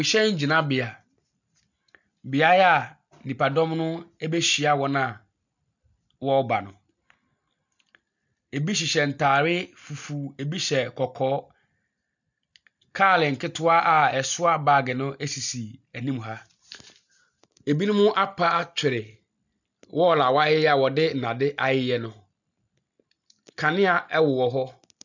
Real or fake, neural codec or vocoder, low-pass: real; none; 7.2 kHz